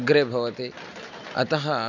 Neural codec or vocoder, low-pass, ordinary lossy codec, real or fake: none; 7.2 kHz; none; real